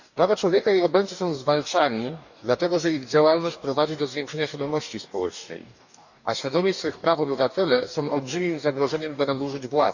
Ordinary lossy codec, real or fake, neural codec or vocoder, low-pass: none; fake; codec, 44.1 kHz, 2.6 kbps, DAC; 7.2 kHz